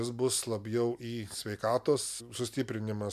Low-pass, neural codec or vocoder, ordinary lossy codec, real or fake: 14.4 kHz; none; MP3, 96 kbps; real